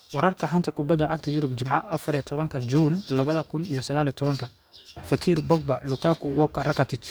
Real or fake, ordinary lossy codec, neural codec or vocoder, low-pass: fake; none; codec, 44.1 kHz, 2.6 kbps, DAC; none